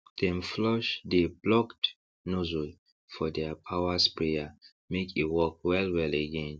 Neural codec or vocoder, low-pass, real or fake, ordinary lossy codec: none; none; real; none